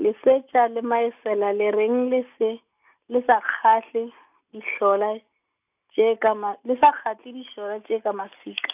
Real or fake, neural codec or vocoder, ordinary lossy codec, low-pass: real; none; AAC, 32 kbps; 3.6 kHz